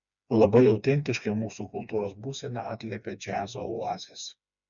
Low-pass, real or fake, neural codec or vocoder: 7.2 kHz; fake; codec, 16 kHz, 2 kbps, FreqCodec, smaller model